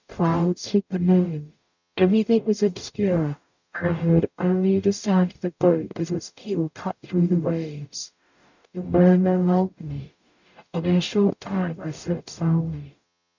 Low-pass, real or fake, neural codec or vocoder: 7.2 kHz; fake; codec, 44.1 kHz, 0.9 kbps, DAC